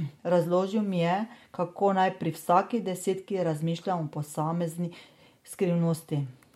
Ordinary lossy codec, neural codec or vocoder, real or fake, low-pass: MP3, 64 kbps; none; real; 19.8 kHz